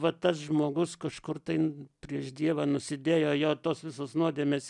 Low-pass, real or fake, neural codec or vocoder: 10.8 kHz; real; none